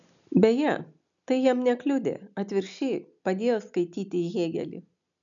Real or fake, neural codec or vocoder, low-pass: real; none; 7.2 kHz